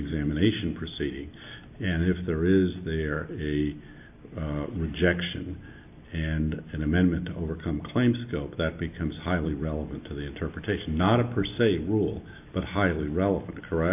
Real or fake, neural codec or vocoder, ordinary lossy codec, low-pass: real; none; AAC, 32 kbps; 3.6 kHz